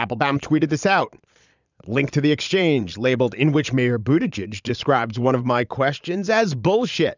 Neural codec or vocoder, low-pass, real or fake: vocoder, 22.05 kHz, 80 mel bands, Vocos; 7.2 kHz; fake